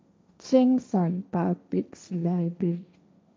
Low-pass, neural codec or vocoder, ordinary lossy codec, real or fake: none; codec, 16 kHz, 1.1 kbps, Voila-Tokenizer; none; fake